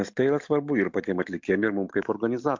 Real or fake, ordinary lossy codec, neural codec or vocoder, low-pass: real; MP3, 64 kbps; none; 7.2 kHz